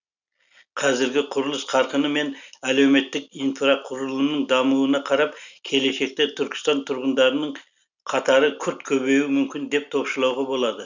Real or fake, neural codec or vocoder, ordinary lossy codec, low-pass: real; none; none; 7.2 kHz